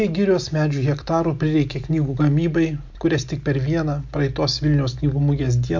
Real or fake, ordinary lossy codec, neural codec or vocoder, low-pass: real; MP3, 48 kbps; none; 7.2 kHz